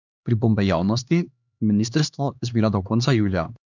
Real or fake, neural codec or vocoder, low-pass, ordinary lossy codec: fake; codec, 16 kHz, 2 kbps, X-Codec, HuBERT features, trained on LibriSpeech; 7.2 kHz; none